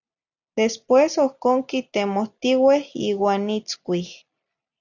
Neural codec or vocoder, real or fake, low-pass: none; real; 7.2 kHz